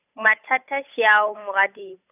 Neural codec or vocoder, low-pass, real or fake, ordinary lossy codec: codec, 16 kHz, 8 kbps, FunCodec, trained on Chinese and English, 25 frames a second; 3.6 kHz; fake; none